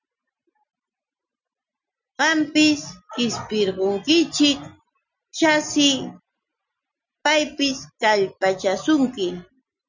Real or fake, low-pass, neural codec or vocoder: real; 7.2 kHz; none